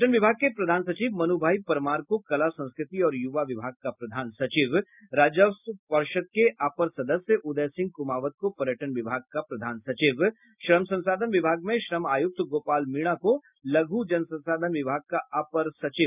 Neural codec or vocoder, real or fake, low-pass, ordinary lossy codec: none; real; 3.6 kHz; none